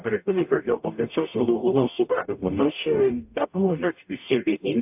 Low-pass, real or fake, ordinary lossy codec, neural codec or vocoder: 3.6 kHz; fake; AAC, 32 kbps; codec, 44.1 kHz, 0.9 kbps, DAC